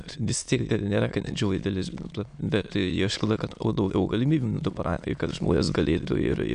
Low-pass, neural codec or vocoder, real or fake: 9.9 kHz; autoencoder, 22.05 kHz, a latent of 192 numbers a frame, VITS, trained on many speakers; fake